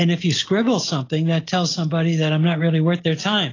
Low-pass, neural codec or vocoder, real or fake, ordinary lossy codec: 7.2 kHz; none; real; AAC, 32 kbps